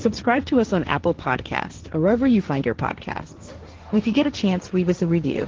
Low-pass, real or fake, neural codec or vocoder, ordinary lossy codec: 7.2 kHz; fake; codec, 16 kHz, 1.1 kbps, Voila-Tokenizer; Opus, 16 kbps